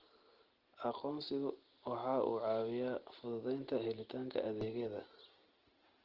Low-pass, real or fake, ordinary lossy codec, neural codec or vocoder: 5.4 kHz; real; Opus, 16 kbps; none